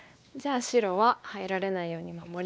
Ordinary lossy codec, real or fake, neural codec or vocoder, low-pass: none; fake; codec, 16 kHz, 2 kbps, X-Codec, WavLM features, trained on Multilingual LibriSpeech; none